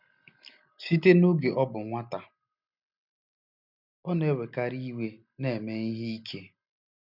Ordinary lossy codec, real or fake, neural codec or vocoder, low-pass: none; real; none; 5.4 kHz